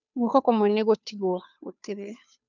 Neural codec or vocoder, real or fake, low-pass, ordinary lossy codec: codec, 16 kHz, 2 kbps, FunCodec, trained on Chinese and English, 25 frames a second; fake; 7.2 kHz; none